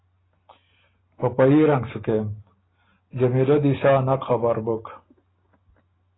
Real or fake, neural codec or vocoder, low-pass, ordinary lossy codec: real; none; 7.2 kHz; AAC, 16 kbps